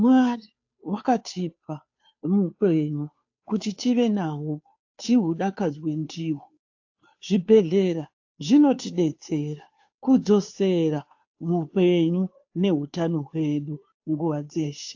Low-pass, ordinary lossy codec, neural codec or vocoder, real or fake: 7.2 kHz; AAC, 48 kbps; codec, 16 kHz, 2 kbps, FunCodec, trained on Chinese and English, 25 frames a second; fake